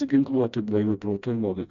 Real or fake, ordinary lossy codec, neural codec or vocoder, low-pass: fake; MP3, 96 kbps; codec, 16 kHz, 1 kbps, FreqCodec, smaller model; 7.2 kHz